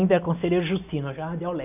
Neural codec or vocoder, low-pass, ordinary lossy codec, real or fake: none; 3.6 kHz; none; real